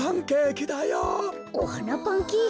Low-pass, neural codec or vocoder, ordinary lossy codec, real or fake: none; none; none; real